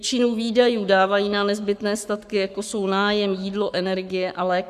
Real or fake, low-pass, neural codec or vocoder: fake; 14.4 kHz; codec, 44.1 kHz, 7.8 kbps, Pupu-Codec